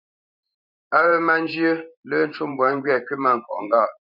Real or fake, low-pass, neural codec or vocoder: fake; 5.4 kHz; vocoder, 44.1 kHz, 128 mel bands every 512 samples, BigVGAN v2